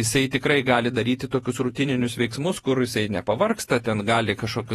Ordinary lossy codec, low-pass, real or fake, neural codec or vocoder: AAC, 32 kbps; 19.8 kHz; fake; vocoder, 48 kHz, 128 mel bands, Vocos